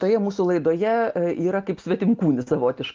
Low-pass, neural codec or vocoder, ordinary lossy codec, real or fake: 7.2 kHz; none; Opus, 32 kbps; real